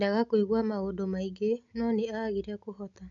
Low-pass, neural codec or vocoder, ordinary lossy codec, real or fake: 7.2 kHz; none; none; real